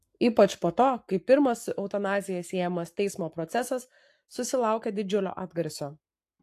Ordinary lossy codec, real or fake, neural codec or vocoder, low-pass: AAC, 64 kbps; fake; codec, 44.1 kHz, 7.8 kbps, Pupu-Codec; 14.4 kHz